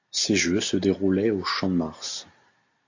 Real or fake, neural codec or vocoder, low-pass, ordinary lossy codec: real; none; 7.2 kHz; AAC, 48 kbps